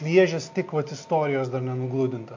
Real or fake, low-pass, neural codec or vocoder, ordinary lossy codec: real; 7.2 kHz; none; MP3, 48 kbps